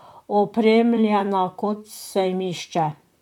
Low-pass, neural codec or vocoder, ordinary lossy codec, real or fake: 19.8 kHz; vocoder, 44.1 kHz, 128 mel bands, Pupu-Vocoder; none; fake